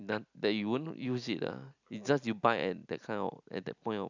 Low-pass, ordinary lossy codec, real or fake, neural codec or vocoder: 7.2 kHz; none; real; none